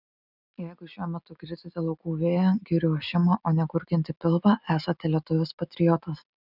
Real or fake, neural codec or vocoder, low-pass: real; none; 5.4 kHz